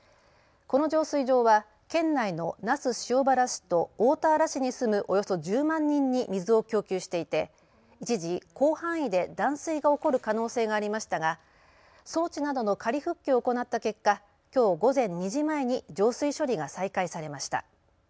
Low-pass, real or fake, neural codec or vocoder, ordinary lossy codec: none; real; none; none